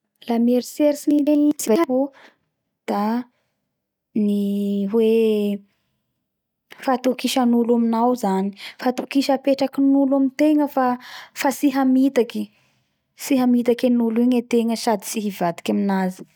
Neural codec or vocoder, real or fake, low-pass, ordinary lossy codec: none; real; 19.8 kHz; none